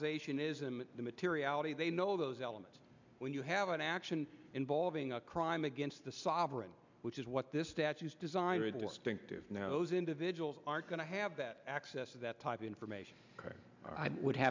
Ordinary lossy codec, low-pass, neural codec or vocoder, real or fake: MP3, 64 kbps; 7.2 kHz; none; real